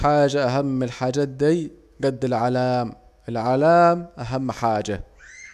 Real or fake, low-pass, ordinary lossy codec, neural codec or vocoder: fake; 14.4 kHz; none; autoencoder, 48 kHz, 128 numbers a frame, DAC-VAE, trained on Japanese speech